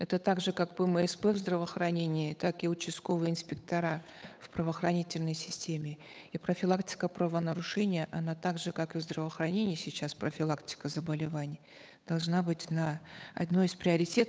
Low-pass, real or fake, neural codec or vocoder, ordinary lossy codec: none; fake; codec, 16 kHz, 8 kbps, FunCodec, trained on Chinese and English, 25 frames a second; none